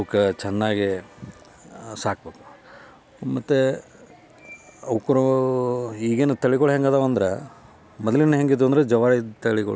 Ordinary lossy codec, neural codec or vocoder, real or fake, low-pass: none; none; real; none